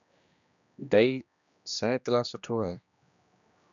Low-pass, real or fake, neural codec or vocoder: 7.2 kHz; fake; codec, 16 kHz, 1 kbps, X-Codec, HuBERT features, trained on general audio